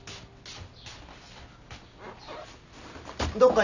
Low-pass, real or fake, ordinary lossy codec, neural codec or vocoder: 7.2 kHz; real; none; none